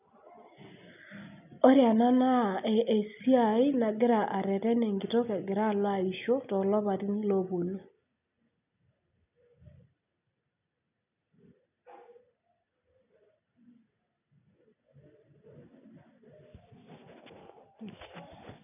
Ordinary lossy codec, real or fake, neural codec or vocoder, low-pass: none; real; none; 3.6 kHz